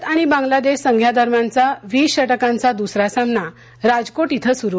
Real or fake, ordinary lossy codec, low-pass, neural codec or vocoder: real; none; none; none